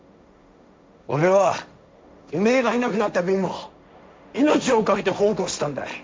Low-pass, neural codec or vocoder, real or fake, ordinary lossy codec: none; codec, 16 kHz, 1.1 kbps, Voila-Tokenizer; fake; none